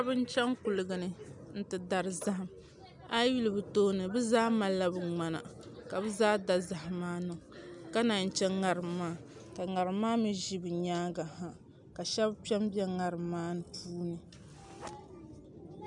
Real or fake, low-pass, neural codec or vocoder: real; 10.8 kHz; none